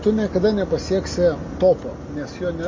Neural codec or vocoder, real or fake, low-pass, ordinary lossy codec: none; real; 7.2 kHz; MP3, 32 kbps